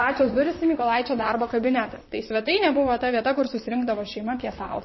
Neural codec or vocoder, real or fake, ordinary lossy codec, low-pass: vocoder, 44.1 kHz, 128 mel bands, Pupu-Vocoder; fake; MP3, 24 kbps; 7.2 kHz